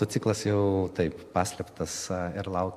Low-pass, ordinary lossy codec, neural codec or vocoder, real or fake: 14.4 kHz; MP3, 64 kbps; none; real